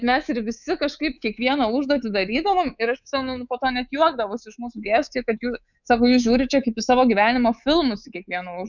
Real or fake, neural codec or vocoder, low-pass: fake; codec, 24 kHz, 3.1 kbps, DualCodec; 7.2 kHz